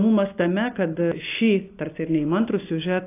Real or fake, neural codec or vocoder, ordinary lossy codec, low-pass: real; none; AAC, 24 kbps; 3.6 kHz